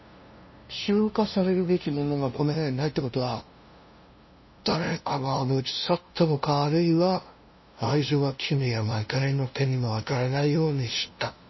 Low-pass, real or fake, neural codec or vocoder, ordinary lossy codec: 7.2 kHz; fake; codec, 16 kHz, 0.5 kbps, FunCodec, trained on LibriTTS, 25 frames a second; MP3, 24 kbps